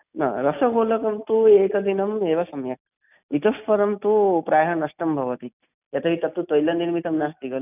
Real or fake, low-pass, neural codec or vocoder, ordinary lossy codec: real; 3.6 kHz; none; none